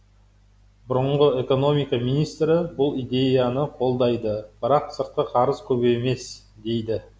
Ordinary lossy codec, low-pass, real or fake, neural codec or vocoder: none; none; real; none